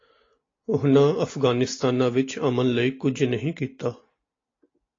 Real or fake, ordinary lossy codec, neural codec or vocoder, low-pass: real; AAC, 32 kbps; none; 7.2 kHz